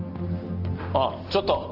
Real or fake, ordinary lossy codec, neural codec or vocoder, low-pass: real; Opus, 24 kbps; none; 5.4 kHz